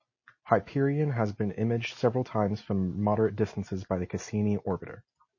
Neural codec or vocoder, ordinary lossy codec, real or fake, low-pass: none; MP3, 32 kbps; real; 7.2 kHz